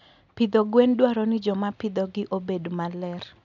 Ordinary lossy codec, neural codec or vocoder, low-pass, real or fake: none; none; 7.2 kHz; real